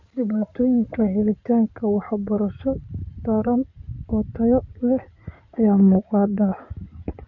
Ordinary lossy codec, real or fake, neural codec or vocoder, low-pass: MP3, 48 kbps; fake; codec, 16 kHz in and 24 kHz out, 2.2 kbps, FireRedTTS-2 codec; 7.2 kHz